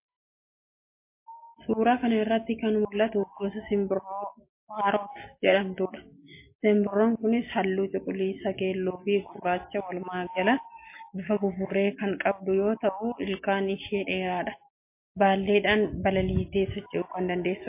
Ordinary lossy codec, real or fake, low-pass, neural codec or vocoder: MP3, 24 kbps; real; 3.6 kHz; none